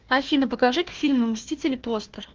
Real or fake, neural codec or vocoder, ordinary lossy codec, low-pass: fake; codec, 16 kHz, 1 kbps, FunCodec, trained on Chinese and English, 50 frames a second; Opus, 16 kbps; 7.2 kHz